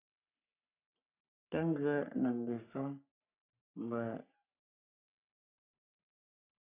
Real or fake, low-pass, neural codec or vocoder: fake; 3.6 kHz; codec, 44.1 kHz, 3.4 kbps, Pupu-Codec